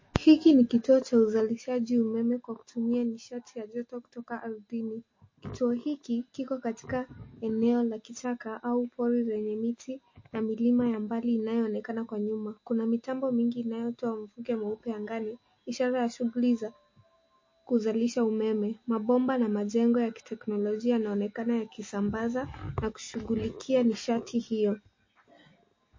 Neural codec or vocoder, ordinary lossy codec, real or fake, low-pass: none; MP3, 32 kbps; real; 7.2 kHz